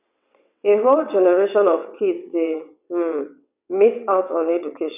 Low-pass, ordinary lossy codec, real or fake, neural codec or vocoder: 3.6 kHz; none; fake; vocoder, 22.05 kHz, 80 mel bands, WaveNeXt